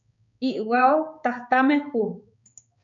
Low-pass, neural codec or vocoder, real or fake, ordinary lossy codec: 7.2 kHz; codec, 16 kHz, 4 kbps, X-Codec, HuBERT features, trained on balanced general audio; fake; MP3, 64 kbps